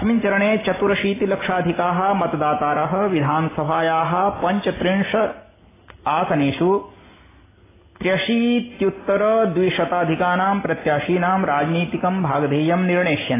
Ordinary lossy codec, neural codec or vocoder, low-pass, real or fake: AAC, 16 kbps; none; 3.6 kHz; real